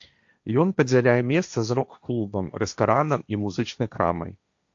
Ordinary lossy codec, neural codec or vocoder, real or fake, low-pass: AAC, 64 kbps; codec, 16 kHz, 1.1 kbps, Voila-Tokenizer; fake; 7.2 kHz